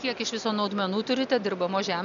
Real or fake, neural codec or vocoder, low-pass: real; none; 7.2 kHz